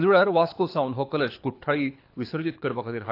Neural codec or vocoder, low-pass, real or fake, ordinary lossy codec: codec, 24 kHz, 6 kbps, HILCodec; 5.4 kHz; fake; AAC, 32 kbps